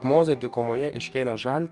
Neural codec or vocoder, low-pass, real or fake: codec, 44.1 kHz, 2.6 kbps, DAC; 10.8 kHz; fake